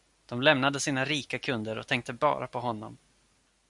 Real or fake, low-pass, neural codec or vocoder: real; 10.8 kHz; none